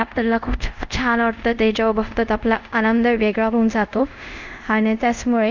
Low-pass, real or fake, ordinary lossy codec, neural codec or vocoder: 7.2 kHz; fake; none; codec, 24 kHz, 0.5 kbps, DualCodec